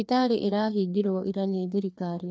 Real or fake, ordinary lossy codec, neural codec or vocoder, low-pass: fake; none; codec, 16 kHz, 2 kbps, FreqCodec, larger model; none